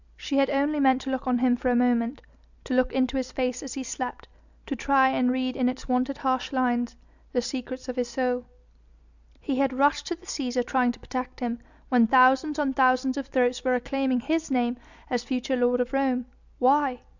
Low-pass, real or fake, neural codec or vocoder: 7.2 kHz; real; none